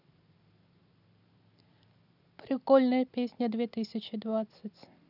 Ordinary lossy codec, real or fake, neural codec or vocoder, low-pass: none; real; none; 5.4 kHz